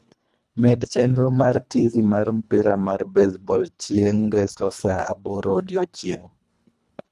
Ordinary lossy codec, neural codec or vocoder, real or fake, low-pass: none; codec, 24 kHz, 1.5 kbps, HILCodec; fake; none